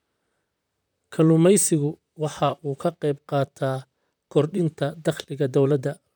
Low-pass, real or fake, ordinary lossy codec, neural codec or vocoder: none; fake; none; vocoder, 44.1 kHz, 128 mel bands, Pupu-Vocoder